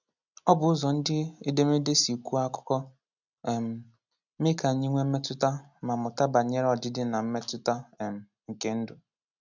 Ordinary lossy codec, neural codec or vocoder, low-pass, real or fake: none; none; 7.2 kHz; real